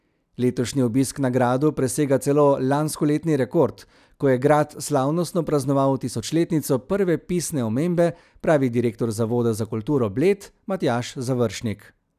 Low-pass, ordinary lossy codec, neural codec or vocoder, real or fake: 14.4 kHz; none; none; real